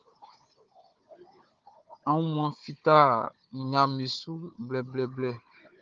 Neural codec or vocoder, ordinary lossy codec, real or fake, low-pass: codec, 16 kHz, 4 kbps, FunCodec, trained on Chinese and English, 50 frames a second; Opus, 32 kbps; fake; 7.2 kHz